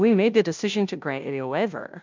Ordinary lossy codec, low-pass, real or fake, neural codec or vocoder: MP3, 64 kbps; 7.2 kHz; fake; codec, 16 kHz, 0.5 kbps, FunCodec, trained on Chinese and English, 25 frames a second